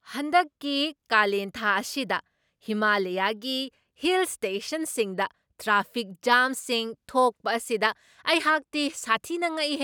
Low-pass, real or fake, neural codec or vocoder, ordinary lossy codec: none; real; none; none